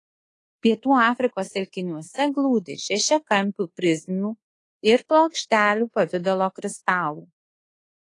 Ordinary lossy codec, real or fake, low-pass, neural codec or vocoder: AAC, 32 kbps; fake; 10.8 kHz; codec, 24 kHz, 1.2 kbps, DualCodec